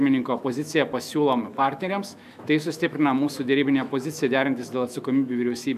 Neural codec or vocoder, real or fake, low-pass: autoencoder, 48 kHz, 128 numbers a frame, DAC-VAE, trained on Japanese speech; fake; 14.4 kHz